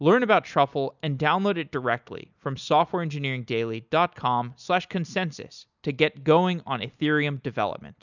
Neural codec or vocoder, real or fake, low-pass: none; real; 7.2 kHz